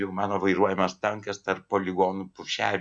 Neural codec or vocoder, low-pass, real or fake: none; 9.9 kHz; real